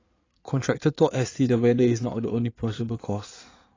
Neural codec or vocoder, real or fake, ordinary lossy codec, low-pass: codec, 16 kHz in and 24 kHz out, 2.2 kbps, FireRedTTS-2 codec; fake; AAC, 32 kbps; 7.2 kHz